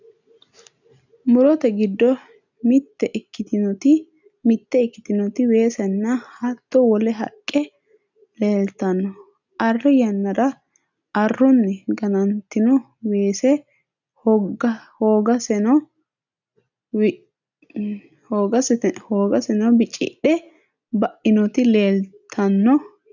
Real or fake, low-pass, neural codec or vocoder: real; 7.2 kHz; none